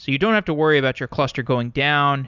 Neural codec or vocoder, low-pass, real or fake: none; 7.2 kHz; real